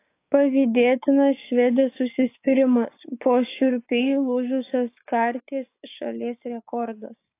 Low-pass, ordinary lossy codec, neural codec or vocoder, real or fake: 3.6 kHz; AAC, 24 kbps; codec, 16 kHz, 6 kbps, DAC; fake